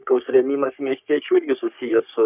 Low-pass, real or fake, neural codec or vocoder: 3.6 kHz; fake; codec, 44.1 kHz, 3.4 kbps, Pupu-Codec